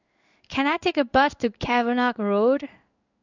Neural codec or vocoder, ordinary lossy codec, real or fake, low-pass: codec, 16 kHz in and 24 kHz out, 1 kbps, XY-Tokenizer; none; fake; 7.2 kHz